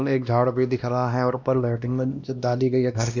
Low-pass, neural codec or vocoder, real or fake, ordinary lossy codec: 7.2 kHz; codec, 16 kHz, 1 kbps, X-Codec, WavLM features, trained on Multilingual LibriSpeech; fake; none